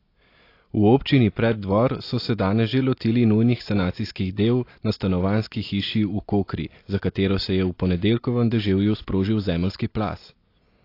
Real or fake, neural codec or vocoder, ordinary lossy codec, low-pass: real; none; AAC, 32 kbps; 5.4 kHz